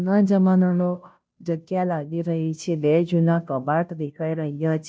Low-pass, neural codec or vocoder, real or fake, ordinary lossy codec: none; codec, 16 kHz, 0.5 kbps, FunCodec, trained on Chinese and English, 25 frames a second; fake; none